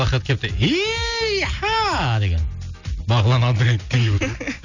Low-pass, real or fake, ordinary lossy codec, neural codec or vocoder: 7.2 kHz; real; none; none